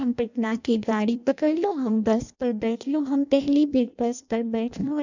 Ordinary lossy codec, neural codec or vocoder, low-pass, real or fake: none; codec, 16 kHz in and 24 kHz out, 0.6 kbps, FireRedTTS-2 codec; 7.2 kHz; fake